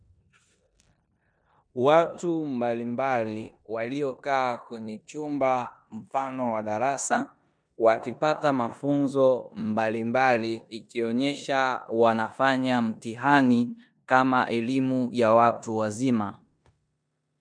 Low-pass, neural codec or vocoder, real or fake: 9.9 kHz; codec, 16 kHz in and 24 kHz out, 0.9 kbps, LongCat-Audio-Codec, four codebook decoder; fake